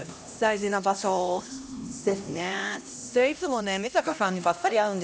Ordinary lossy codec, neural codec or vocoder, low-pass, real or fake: none; codec, 16 kHz, 1 kbps, X-Codec, HuBERT features, trained on LibriSpeech; none; fake